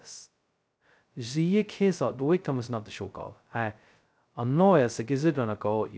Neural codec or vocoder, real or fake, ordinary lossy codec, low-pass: codec, 16 kHz, 0.2 kbps, FocalCodec; fake; none; none